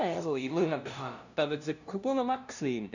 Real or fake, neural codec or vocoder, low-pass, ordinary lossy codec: fake; codec, 16 kHz, 0.5 kbps, FunCodec, trained on LibriTTS, 25 frames a second; 7.2 kHz; none